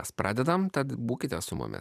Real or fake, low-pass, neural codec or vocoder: fake; 14.4 kHz; vocoder, 44.1 kHz, 128 mel bands every 512 samples, BigVGAN v2